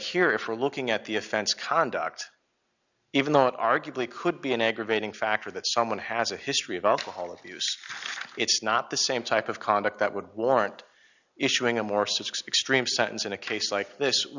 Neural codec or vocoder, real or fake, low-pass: none; real; 7.2 kHz